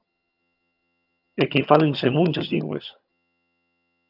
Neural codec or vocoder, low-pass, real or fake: vocoder, 22.05 kHz, 80 mel bands, HiFi-GAN; 5.4 kHz; fake